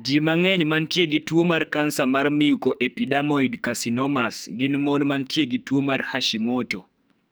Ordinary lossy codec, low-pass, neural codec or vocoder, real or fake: none; none; codec, 44.1 kHz, 2.6 kbps, SNAC; fake